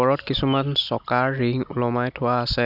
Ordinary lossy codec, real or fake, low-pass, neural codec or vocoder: none; real; 5.4 kHz; none